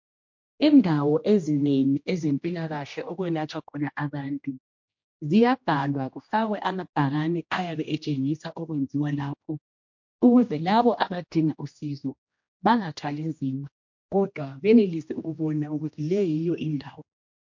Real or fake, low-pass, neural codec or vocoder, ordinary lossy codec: fake; 7.2 kHz; codec, 16 kHz, 1 kbps, X-Codec, HuBERT features, trained on general audio; MP3, 48 kbps